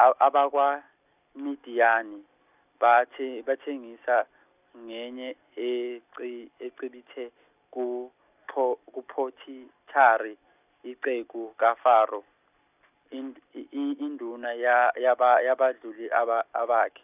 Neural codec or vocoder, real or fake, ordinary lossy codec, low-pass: none; real; none; 3.6 kHz